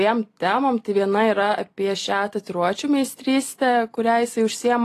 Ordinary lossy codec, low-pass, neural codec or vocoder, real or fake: AAC, 48 kbps; 14.4 kHz; none; real